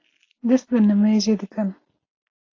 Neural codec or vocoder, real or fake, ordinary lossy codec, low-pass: none; real; AAC, 32 kbps; 7.2 kHz